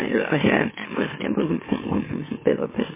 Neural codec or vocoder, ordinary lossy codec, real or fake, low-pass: autoencoder, 44.1 kHz, a latent of 192 numbers a frame, MeloTTS; MP3, 16 kbps; fake; 3.6 kHz